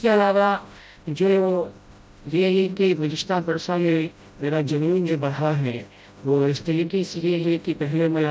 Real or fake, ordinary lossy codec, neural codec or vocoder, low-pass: fake; none; codec, 16 kHz, 0.5 kbps, FreqCodec, smaller model; none